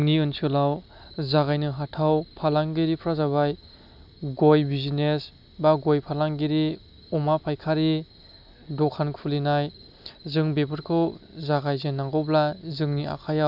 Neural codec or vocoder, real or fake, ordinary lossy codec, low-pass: none; real; AAC, 48 kbps; 5.4 kHz